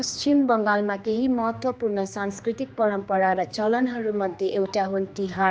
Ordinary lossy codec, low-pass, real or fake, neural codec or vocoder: none; none; fake; codec, 16 kHz, 2 kbps, X-Codec, HuBERT features, trained on general audio